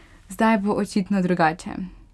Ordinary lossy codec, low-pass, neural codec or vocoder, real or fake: none; none; none; real